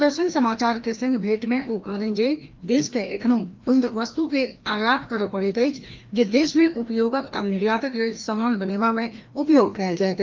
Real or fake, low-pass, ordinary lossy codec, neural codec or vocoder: fake; 7.2 kHz; Opus, 24 kbps; codec, 16 kHz, 1 kbps, FreqCodec, larger model